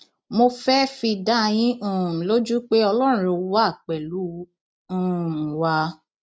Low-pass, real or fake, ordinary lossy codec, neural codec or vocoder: none; real; none; none